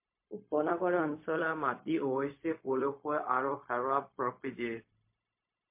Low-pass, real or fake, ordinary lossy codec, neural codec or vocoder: 3.6 kHz; fake; MP3, 24 kbps; codec, 16 kHz, 0.4 kbps, LongCat-Audio-Codec